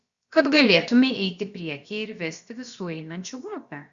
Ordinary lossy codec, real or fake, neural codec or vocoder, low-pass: Opus, 64 kbps; fake; codec, 16 kHz, about 1 kbps, DyCAST, with the encoder's durations; 7.2 kHz